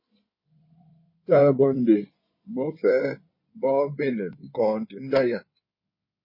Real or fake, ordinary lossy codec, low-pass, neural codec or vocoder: fake; MP3, 24 kbps; 5.4 kHz; codec, 16 kHz in and 24 kHz out, 2.2 kbps, FireRedTTS-2 codec